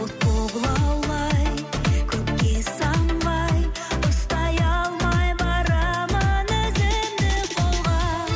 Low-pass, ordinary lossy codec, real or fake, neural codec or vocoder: none; none; real; none